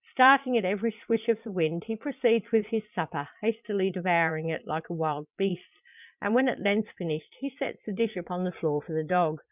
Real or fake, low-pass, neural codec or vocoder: fake; 3.6 kHz; vocoder, 22.05 kHz, 80 mel bands, Vocos